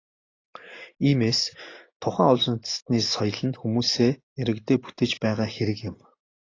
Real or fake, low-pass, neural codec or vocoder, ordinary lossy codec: real; 7.2 kHz; none; AAC, 32 kbps